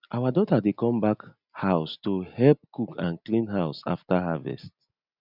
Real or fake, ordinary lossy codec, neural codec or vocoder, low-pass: real; none; none; 5.4 kHz